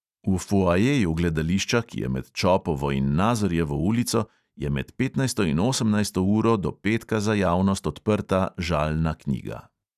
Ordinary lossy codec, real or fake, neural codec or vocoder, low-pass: none; real; none; 14.4 kHz